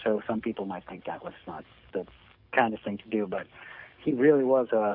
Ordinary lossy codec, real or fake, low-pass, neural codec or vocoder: AAC, 48 kbps; fake; 5.4 kHz; codec, 44.1 kHz, 7.8 kbps, DAC